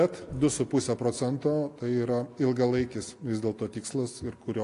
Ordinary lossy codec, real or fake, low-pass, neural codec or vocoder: AAC, 48 kbps; real; 10.8 kHz; none